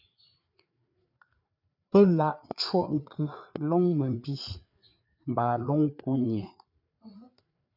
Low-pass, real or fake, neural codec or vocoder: 5.4 kHz; fake; codec, 16 kHz, 4 kbps, FreqCodec, larger model